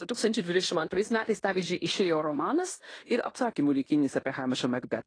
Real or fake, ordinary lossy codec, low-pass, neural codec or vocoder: fake; AAC, 32 kbps; 9.9 kHz; codec, 16 kHz in and 24 kHz out, 0.9 kbps, LongCat-Audio-Codec, fine tuned four codebook decoder